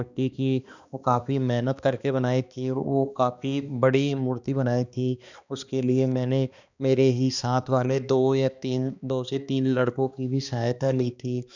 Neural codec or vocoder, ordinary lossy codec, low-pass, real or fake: codec, 16 kHz, 2 kbps, X-Codec, HuBERT features, trained on balanced general audio; none; 7.2 kHz; fake